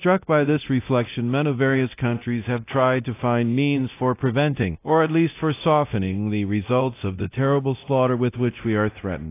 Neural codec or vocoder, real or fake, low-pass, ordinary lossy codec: codec, 24 kHz, 0.9 kbps, DualCodec; fake; 3.6 kHz; AAC, 24 kbps